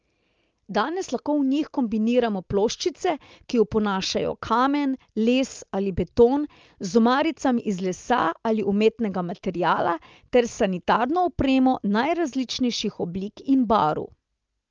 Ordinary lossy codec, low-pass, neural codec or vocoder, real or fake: Opus, 24 kbps; 7.2 kHz; none; real